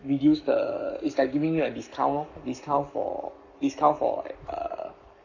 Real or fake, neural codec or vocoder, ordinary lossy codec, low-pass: fake; codec, 44.1 kHz, 7.8 kbps, Pupu-Codec; none; 7.2 kHz